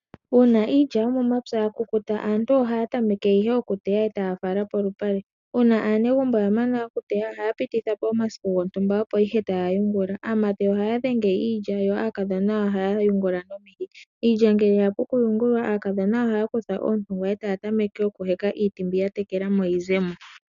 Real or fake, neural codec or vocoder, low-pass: real; none; 7.2 kHz